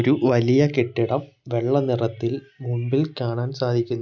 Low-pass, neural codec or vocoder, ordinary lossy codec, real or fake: 7.2 kHz; none; none; real